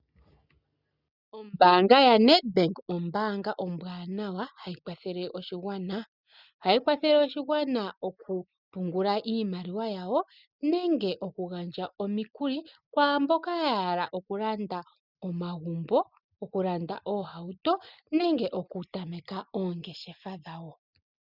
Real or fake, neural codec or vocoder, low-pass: real; none; 5.4 kHz